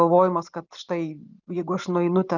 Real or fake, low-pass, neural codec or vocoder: real; 7.2 kHz; none